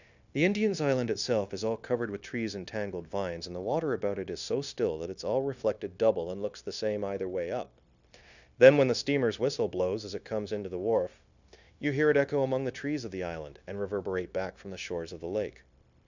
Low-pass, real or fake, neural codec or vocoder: 7.2 kHz; fake; codec, 16 kHz, 0.9 kbps, LongCat-Audio-Codec